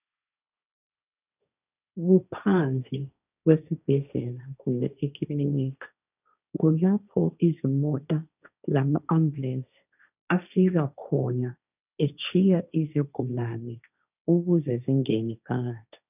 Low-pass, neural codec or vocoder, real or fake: 3.6 kHz; codec, 16 kHz, 1.1 kbps, Voila-Tokenizer; fake